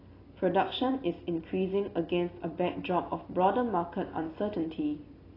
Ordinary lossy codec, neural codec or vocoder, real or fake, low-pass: AAC, 24 kbps; none; real; 5.4 kHz